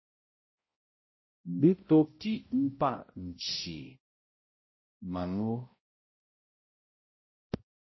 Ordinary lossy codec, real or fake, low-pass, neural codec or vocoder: MP3, 24 kbps; fake; 7.2 kHz; codec, 16 kHz, 0.5 kbps, X-Codec, HuBERT features, trained on balanced general audio